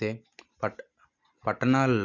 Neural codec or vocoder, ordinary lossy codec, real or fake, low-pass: none; Opus, 64 kbps; real; 7.2 kHz